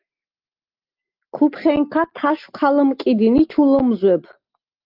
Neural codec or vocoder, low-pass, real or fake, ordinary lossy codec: none; 5.4 kHz; real; Opus, 32 kbps